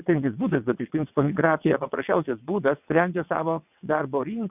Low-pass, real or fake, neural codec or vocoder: 3.6 kHz; fake; vocoder, 22.05 kHz, 80 mel bands, WaveNeXt